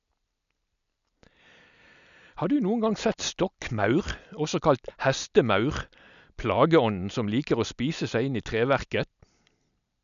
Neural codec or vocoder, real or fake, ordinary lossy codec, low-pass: none; real; none; 7.2 kHz